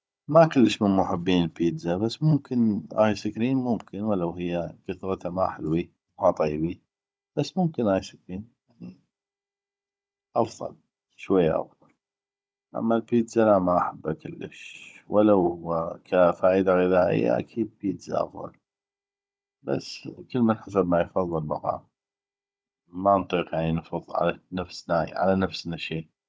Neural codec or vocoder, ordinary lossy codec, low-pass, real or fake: codec, 16 kHz, 16 kbps, FunCodec, trained on Chinese and English, 50 frames a second; none; none; fake